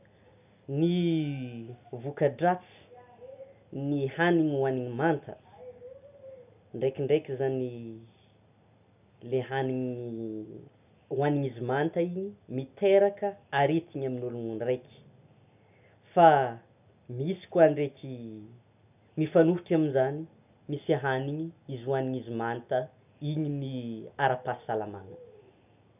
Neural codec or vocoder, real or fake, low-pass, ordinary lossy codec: none; real; 3.6 kHz; none